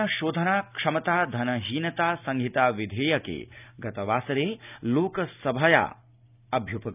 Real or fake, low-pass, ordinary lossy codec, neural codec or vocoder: real; 3.6 kHz; none; none